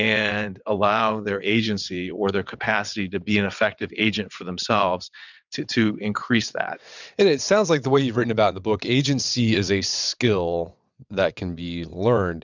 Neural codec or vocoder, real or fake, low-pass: vocoder, 22.05 kHz, 80 mel bands, WaveNeXt; fake; 7.2 kHz